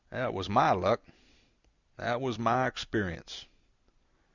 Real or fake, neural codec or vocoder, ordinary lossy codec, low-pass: real; none; Opus, 64 kbps; 7.2 kHz